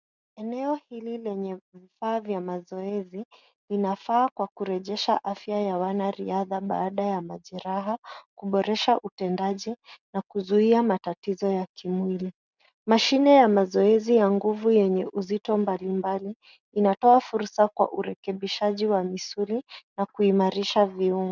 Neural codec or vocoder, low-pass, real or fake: none; 7.2 kHz; real